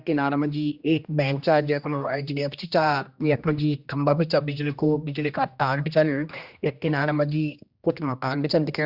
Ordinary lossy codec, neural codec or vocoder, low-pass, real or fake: Opus, 64 kbps; codec, 16 kHz, 1 kbps, X-Codec, HuBERT features, trained on general audio; 5.4 kHz; fake